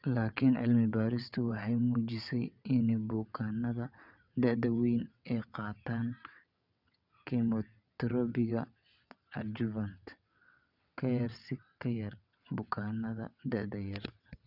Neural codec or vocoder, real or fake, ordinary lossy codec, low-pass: vocoder, 22.05 kHz, 80 mel bands, WaveNeXt; fake; none; 5.4 kHz